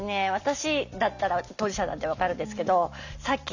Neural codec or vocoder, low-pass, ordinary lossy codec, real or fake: none; 7.2 kHz; none; real